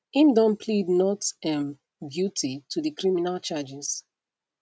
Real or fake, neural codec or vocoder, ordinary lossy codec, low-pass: real; none; none; none